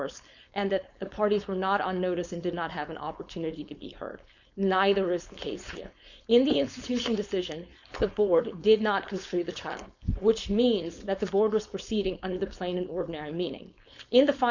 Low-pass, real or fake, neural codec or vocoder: 7.2 kHz; fake; codec, 16 kHz, 4.8 kbps, FACodec